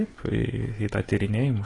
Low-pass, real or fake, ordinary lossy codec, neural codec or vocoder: 10.8 kHz; real; AAC, 32 kbps; none